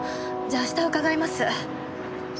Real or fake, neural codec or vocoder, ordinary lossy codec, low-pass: real; none; none; none